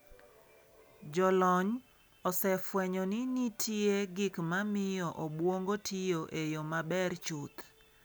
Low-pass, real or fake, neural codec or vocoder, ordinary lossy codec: none; real; none; none